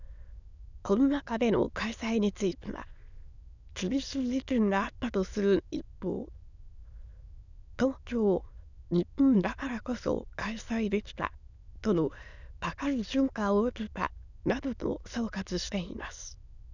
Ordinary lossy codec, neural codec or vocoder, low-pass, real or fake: none; autoencoder, 22.05 kHz, a latent of 192 numbers a frame, VITS, trained on many speakers; 7.2 kHz; fake